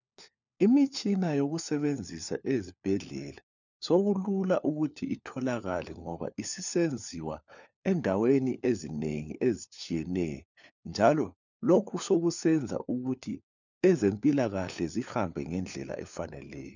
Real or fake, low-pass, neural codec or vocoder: fake; 7.2 kHz; codec, 16 kHz, 4 kbps, FunCodec, trained on LibriTTS, 50 frames a second